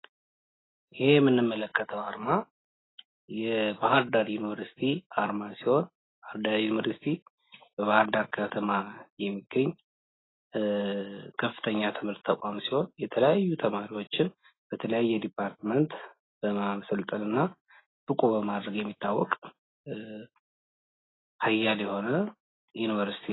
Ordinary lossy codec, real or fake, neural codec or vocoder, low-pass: AAC, 16 kbps; real; none; 7.2 kHz